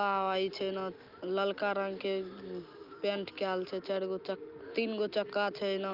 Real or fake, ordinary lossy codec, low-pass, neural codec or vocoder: real; Opus, 24 kbps; 5.4 kHz; none